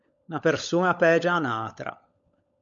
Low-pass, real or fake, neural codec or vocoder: 7.2 kHz; fake; codec, 16 kHz, 16 kbps, FunCodec, trained on LibriTTS, 50 frames a second